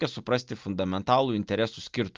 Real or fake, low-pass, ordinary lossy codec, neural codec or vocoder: real; 7.2 kHz; Opus, 24 kbps; none